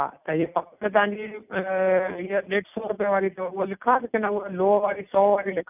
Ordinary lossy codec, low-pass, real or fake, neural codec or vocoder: none; 3.6 kHz; real; none